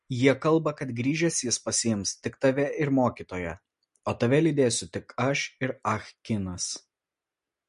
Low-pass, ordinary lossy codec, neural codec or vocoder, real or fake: 10.8 kHz; MP3, 48 kbps; none; real